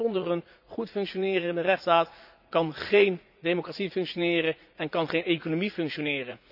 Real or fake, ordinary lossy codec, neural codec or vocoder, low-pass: fake; none; vocoder, 44.1 kHz, 80 mel bands, Vocos; 5.4 kHz